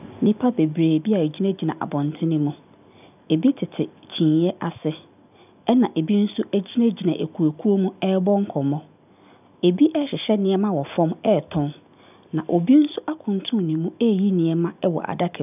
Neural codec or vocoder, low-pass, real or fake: none; 3.6 kHz; real